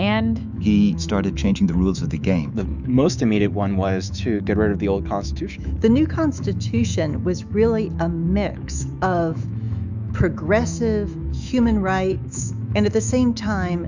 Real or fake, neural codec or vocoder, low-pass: fake; autoencoder, 48 kHz, 128 numbers a frame, DAC-VAE, trained on Japanese speech; 7.2 kHz